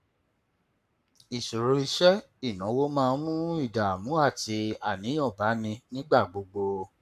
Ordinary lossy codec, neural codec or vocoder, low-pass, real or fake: none; codec, 44.1 kHz, 7.8 kbps, Pupu-Codec; 14.4 kHz; fake